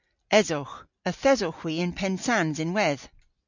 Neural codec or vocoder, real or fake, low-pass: none; real; 7.2 kHz